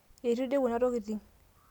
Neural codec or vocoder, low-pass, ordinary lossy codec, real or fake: none; 19.8 kHz; none; real